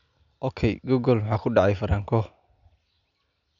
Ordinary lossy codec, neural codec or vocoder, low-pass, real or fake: none; none; 7.2 kHz; real